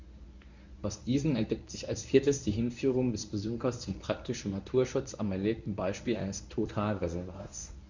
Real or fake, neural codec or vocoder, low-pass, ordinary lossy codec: fake; codec, 24 kHz, 0.9 kbps, WavTokenizer, medium speech release version 1; 7.2 kHz; Opus, 64 kbps